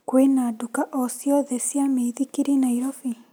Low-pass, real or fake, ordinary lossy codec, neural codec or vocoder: none; real; none; none